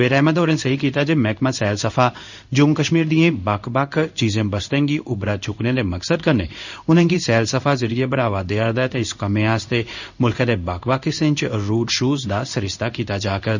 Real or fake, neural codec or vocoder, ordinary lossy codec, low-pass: fake; codec, 16 kHz in and 24 kHz out, 1 kbps, XY-Tokenizer; none; 7.2 kHz